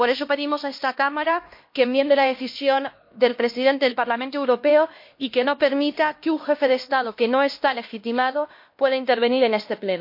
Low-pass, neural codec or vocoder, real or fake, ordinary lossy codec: 5.4 kHz; codec, 16 kHz, 1 kbps, X-Codec, HuBERT features, trained on LibriSpeech; fake; MP3, 32 kbps